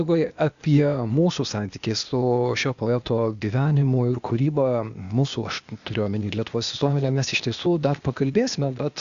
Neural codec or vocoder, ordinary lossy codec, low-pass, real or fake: codec, 16 kHz, 0.8 kbps, ZipCodec; Opus, 64 kbps; 7.2 kHz; fake